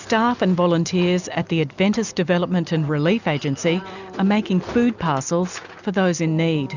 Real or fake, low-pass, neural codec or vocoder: real; 7.2 kHz; none